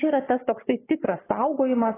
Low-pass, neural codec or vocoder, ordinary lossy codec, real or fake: 3.6 kHz; codec, 44.1 kHz, 7.8 kbps, Pupu-Codec; AAC, 16 kbps; fake